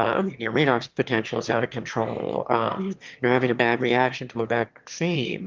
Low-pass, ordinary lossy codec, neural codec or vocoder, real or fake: 7.2 kHz; Opus, 32 kbps; autoencoder, 22.05 kHz, a latent of 192 numbers a frame, VITS, trained on one speaker; fake